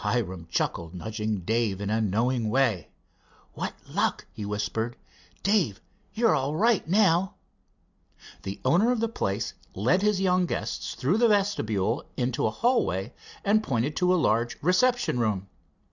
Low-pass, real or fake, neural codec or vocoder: 7.2 kHz; real; none